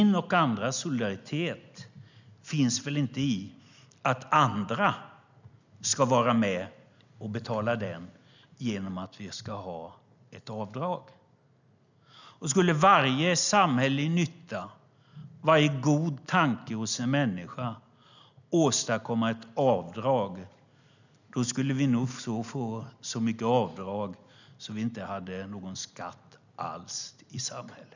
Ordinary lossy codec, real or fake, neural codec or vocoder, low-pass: none; real; none; 7.2 kHz